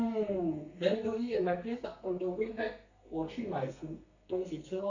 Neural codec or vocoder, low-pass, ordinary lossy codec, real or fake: codec, 32 kHz, 1.9 kbps, SNAC; 7.2 kHz; none; fake